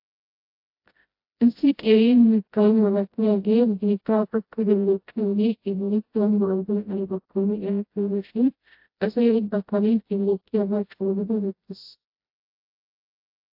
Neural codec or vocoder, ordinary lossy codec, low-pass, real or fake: codec, 16 kHz, 0.5 kbps, FreqCodec, smaller model; AAC, 48 kbps; 5.4 kHz; fake